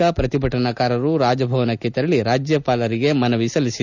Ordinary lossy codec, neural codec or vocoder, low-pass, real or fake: none; none; 7.2 kHz; real